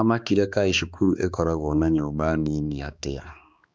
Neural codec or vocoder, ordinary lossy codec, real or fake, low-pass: codec, 16 kHz, 2 kbps, X-Codec, HuBERT features, trained on balanced general audio; none; fake; none